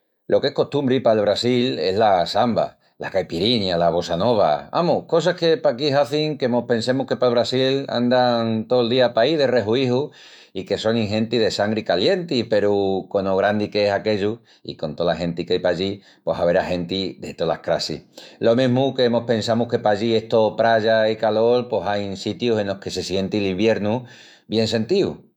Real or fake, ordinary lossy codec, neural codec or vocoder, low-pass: fake; none; autoencoder, 48 kHz, 128 numbers a frame, DAC-VAE, trained on Japanese speech; 19.8 kHz